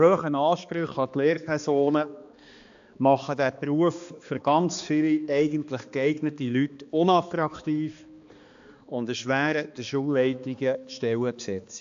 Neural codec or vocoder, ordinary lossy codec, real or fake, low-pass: codec, 16 kHz, 2 kbps, X-Codec, HuBERT features, trained on balanced general audio; AAC, 64 kbps; fake; 7.2 kHz